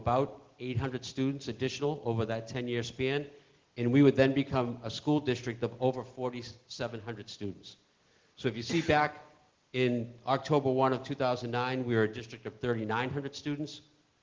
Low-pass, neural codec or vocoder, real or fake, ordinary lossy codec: 7.2 kHz; none; real; Opus, 16 kbps